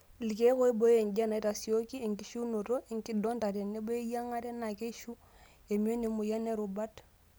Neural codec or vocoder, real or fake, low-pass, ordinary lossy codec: none; real; none; none